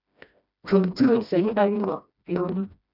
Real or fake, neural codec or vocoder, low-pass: fake; codec, 16 kHz, 1 kbps, FreqCodec, smaller model; 5.4 kHz